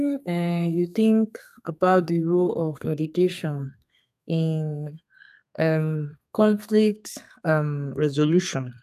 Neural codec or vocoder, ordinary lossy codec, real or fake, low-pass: codec, 32 kHz, 1.9 kbps, SNAC; none; fake; 14.4 kHz